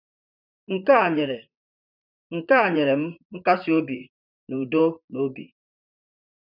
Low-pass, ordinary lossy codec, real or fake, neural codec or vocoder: 5.4 kHz; none; fake; vocoder, 44.1 kHz, 128 mel bands, Pupu-Vocoder